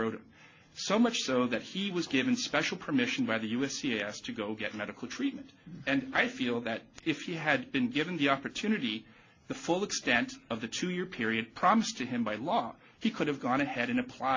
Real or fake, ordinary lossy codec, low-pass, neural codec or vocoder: real; AAC, 48 kbps; 7.2 kHz; none